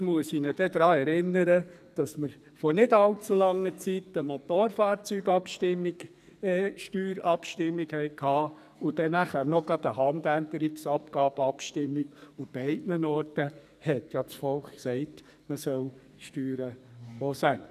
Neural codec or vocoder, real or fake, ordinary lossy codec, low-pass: codec, 44.1 kHz, 2.6 kbps, SNAC; fake; none; 14.4 kHz